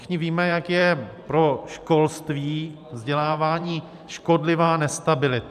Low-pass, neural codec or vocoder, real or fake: 14.4 kHz; vocoder, 44.1 kHz, 128 mel bands every 512 samples, BigVGAN v2; fake